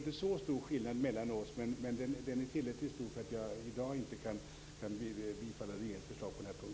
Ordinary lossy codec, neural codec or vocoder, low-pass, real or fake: none; none; none; real